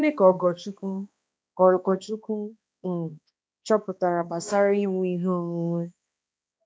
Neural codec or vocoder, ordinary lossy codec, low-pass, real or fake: codec, 16 kHz, 2 kbps, X-Codec, HuBERT features, trained on balanced general audio; none; none; fake